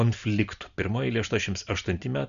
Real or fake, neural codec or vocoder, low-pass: real; none; 7.2 kHz